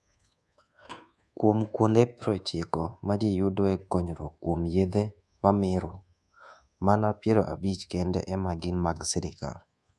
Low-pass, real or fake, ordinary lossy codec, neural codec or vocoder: none; fake; none; codec, 24 kHz, 1.2 kbps, DualCodec